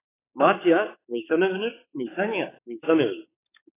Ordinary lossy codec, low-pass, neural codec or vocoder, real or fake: AAC, 16 kbps; 3.6 kHz; codec, 16 kHz, 4 kbps, X-Codec, HuBERT features, trained on balanced general audio; fake